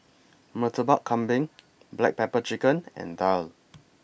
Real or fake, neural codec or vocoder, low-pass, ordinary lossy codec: real; none; none; none